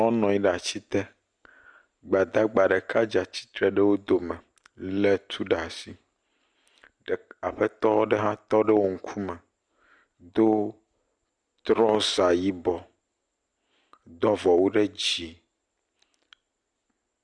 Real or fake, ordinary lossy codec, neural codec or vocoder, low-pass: real; Opus, 64 kbps; none; 9.9 kHz